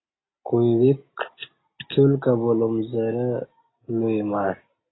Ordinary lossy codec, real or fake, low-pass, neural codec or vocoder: AAC, 16 kbps; real; 7.2 kHz; none